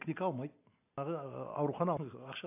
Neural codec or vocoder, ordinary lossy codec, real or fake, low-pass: none; AAC, 32 kbps; real; 3.6 kHz